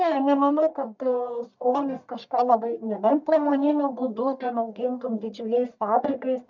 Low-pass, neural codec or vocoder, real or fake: 7.2 kHz; codec, 44.1 kHz, 1.7 kbps, Pupu-Codec; fake